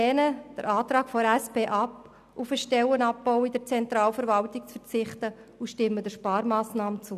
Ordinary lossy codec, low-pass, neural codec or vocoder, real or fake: none; 14.4 kHz; none; real